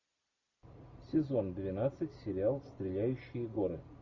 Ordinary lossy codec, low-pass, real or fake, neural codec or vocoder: AAC, 48 kbps; 7.2 kHz; real; none